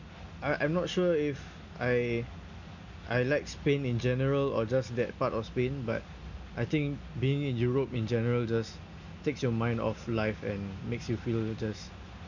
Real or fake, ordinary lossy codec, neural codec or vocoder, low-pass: real; none; none; 7.2 kHz